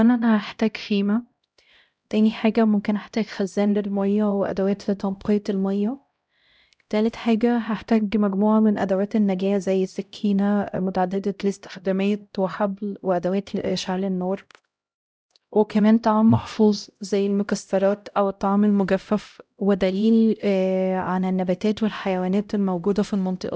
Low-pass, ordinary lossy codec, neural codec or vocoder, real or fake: none; none; codec, 16 kHz, 0.5 kbps, X-Codec, HuBERT features, trained on LibriSpeech; fake